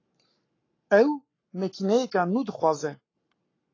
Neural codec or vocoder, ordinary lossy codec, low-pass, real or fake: codec, 44.1 kHz, 7.8 kbps, Pupu-Codec; AAC, 32 kbps; 7.2 kHz; fake